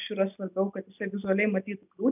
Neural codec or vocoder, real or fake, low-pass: none; real; 3.6 kHz